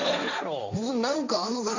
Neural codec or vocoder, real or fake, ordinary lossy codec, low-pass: codec, 16 kHz, 1.1 kbps, Voila-Tokenizer; fake; none; 7.2 kHz